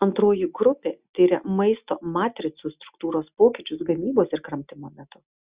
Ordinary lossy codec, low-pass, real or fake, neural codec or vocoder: Opus, 64 kbps; 3.6 kHz; real; none